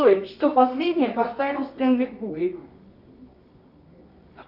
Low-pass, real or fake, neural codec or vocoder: 5.4 kHz; fake; codec, 24 kHz, 0.9 kbps, WavTokenizer, medium music audio release